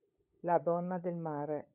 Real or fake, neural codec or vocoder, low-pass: fake; codec, 16 kHz, 8 kbps, FunCodec, trained on LibriTTS, 25 frames a second; 3.6 kHz